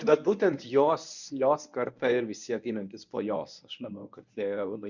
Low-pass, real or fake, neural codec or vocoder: 7.2 kHz; fake; codec, 24 kHz, 0.9 kbps, WavTokenizer, medium speech release version 1